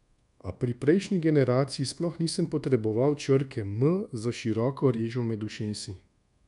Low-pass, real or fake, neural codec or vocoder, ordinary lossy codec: 10.8 kHz; fake; codec, 24 kHz, 1.2 kbps, DualCodec; none